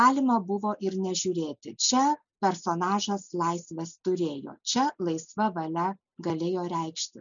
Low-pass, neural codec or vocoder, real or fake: 7.2 kHz; none; real